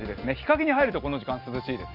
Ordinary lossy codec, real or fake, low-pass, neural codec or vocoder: none; real; 5.4 kHz; none